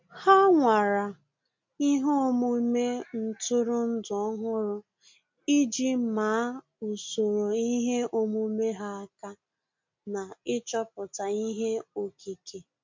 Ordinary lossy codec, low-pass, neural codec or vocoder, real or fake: MP3, 64 kbps; 7.2 kHz; none; real